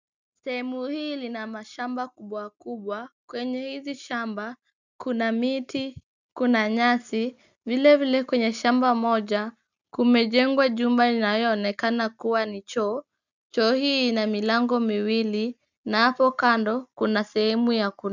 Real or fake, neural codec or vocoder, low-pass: real; none; 7.2 kHz